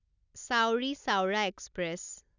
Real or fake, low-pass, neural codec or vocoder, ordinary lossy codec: real; 7.2 kHz; none; none